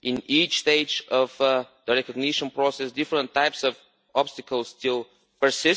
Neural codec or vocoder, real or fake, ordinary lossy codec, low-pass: none; real; none; none